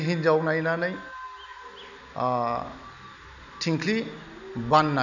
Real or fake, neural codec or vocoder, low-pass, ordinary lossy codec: real; none; 7.2 kHz; none